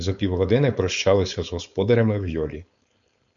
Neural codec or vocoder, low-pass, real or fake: codec, 16 kHz, 4.8 kbps, FACodec; 7.2 kHz; fake